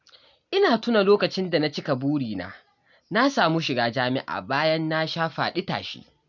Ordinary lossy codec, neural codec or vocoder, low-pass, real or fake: none; none; 7.2 kHz; real